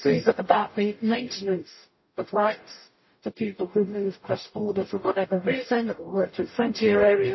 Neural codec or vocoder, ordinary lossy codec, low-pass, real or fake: codec, 44.1 kHz, 0.9 kbps, DAC; MP3, 24 kbps; 7.2 kHz; fake